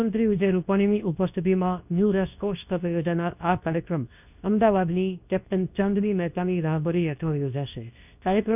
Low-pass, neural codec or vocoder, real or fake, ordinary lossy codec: 3.6 kHz; codec, 24 kHz, 0.9 kbps, WavTokenizer, medium speech release version 2; fake; none